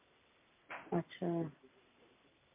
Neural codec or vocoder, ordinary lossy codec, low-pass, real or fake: none; MP3, 32 kbps; 3.6 kHz; real